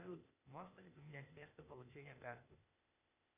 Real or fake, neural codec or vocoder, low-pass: fake; codec, 16 kHz, 0.8 kbps, ZipCodec; 3.6 kHz